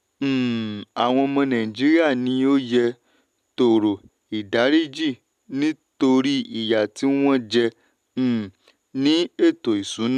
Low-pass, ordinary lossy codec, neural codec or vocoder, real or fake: 14.4 kHz; none; none; real